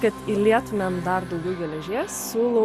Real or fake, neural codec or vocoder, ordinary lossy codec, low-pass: real; none; Opus, 64 kbps; 14.4 kHz